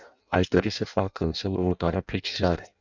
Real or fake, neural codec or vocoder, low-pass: fake; codec, 16 kHz in and 24 kHz out, 0.6 kbps, FireRedTTS-2 codec; 7.2 kHz